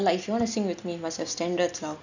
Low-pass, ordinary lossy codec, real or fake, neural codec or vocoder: 7.2 kHz; none; real; none